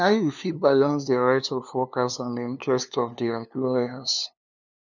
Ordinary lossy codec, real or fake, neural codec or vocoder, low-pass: none; fake; codec, 16 kHz, 2 kbps, FunCodec, trained on LibriTTS, 25 frames a second; 7.2 kHz